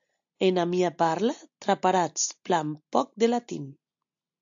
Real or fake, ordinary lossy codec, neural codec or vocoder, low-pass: real; AAC, 64 kbps; none; 7.2 kHz